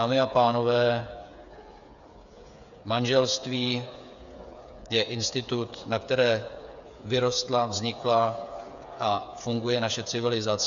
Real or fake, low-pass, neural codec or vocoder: fake; 7.2 kHz; codec, 16 kHz, 8 kbps, FreqCodec, smaller model